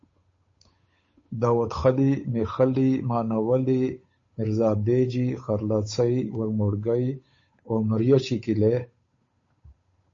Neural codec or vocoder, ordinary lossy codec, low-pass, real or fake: codec, 16 kHz, 8 kbps, FunCodec, trained on Chinese and English, 25 frames a second; MP3, 32 kbps; 7.2 kHz; fake